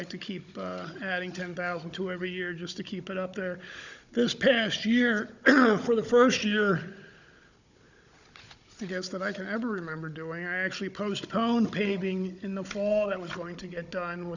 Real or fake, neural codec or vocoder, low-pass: fake; codec, 16 kHz, 16 kbps, FunCodec, trained on Chinese and English, 50 frames a second; 7.2 kHz